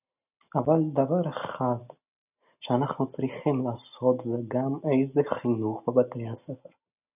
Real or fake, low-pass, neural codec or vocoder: real; 3.6 kHz; none